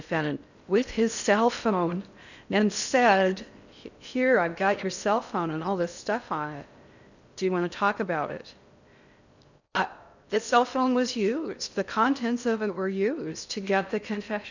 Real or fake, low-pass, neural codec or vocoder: fake; 7.2 kHz; codec, 16 kHz in and 24 kHz out, 0.6 kbps, FocalCodec, streaming, 4096 codes